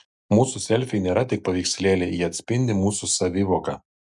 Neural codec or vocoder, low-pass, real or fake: none; 10.8 kHz; real